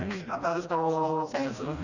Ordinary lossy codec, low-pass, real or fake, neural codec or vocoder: none; 7.2 kHz; fake; codec, 16 kHz, 1 kbps, FreqCodec, smaller model